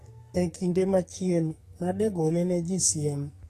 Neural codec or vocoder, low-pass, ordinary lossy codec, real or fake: codec, 44.1 kHz, 2.6 kbps, SNAC; 14.4 kHz; AAC, 48 kbps; fake